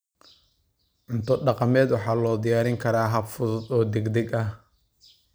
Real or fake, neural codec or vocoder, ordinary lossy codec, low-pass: real; none; none; none